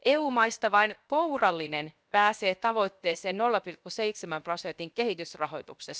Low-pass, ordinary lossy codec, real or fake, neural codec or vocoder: none; none; fake; codec, 16 kHz, 0.7 kbps, FocalCodec